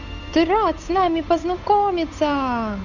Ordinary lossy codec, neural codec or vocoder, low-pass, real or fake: none; codec, 16 kHz, 8 kbps, FunCodec, trained on Chinese and English, 25 frames a second; 7.2 kHz; fake